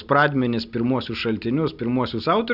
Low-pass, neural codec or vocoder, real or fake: 5.4 kHz; none; real